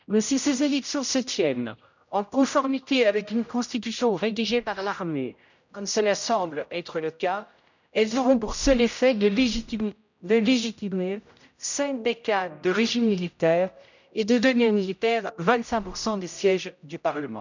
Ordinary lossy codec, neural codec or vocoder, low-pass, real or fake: none; codec, 16 kHz, 0.5 kbps, X-Codec, HuBERT features, trained on general audio; 7.2 kHz; fake